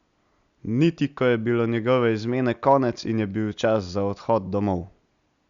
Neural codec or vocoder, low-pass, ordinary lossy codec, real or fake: none; 7.2 kHz; Opus, 64 kbps; real